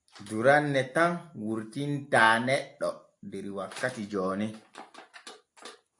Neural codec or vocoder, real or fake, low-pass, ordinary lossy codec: none; real; 10.8 kHz; AAC, 64 kbps